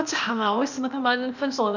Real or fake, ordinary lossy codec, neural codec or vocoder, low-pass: fake; none; codec, 16 kHz, 0.8 kbps, ZipCodec; 7.2 kHz